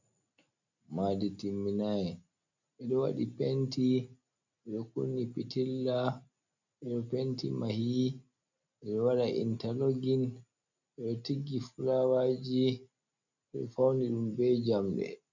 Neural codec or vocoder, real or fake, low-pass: none; real; 7.2 kHz